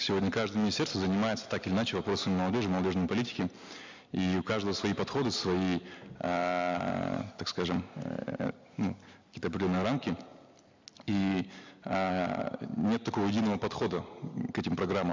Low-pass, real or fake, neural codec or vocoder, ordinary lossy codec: 7.2 kHz; real; none; MP3, 64 kbps